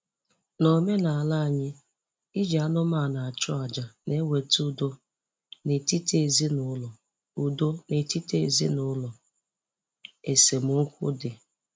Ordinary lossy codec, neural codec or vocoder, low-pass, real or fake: none; none; none; real